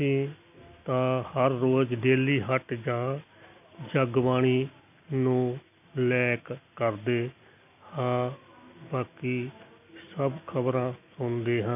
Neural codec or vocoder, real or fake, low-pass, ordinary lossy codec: none; real; 3.6 kHz; none